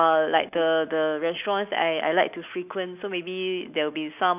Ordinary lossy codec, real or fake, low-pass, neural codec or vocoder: none; real; 3.6 kHz; none